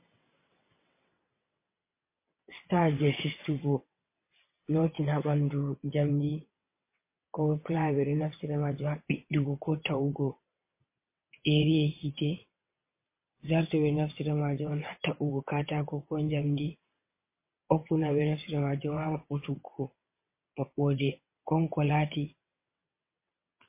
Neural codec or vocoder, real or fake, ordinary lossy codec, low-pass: vocoder, 22.05 kHz, 80 mel bands, WaveNeXt; fake; MP3, 24 kbps; 3.6 kHz